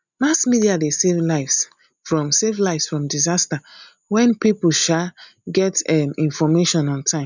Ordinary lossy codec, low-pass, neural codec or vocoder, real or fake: none; 7.2 kHz; none; real